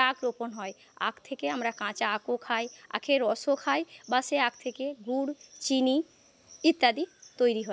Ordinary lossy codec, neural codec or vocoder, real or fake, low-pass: none; none; real; none